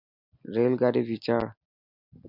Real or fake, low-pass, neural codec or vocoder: real; 5.4 kHz; none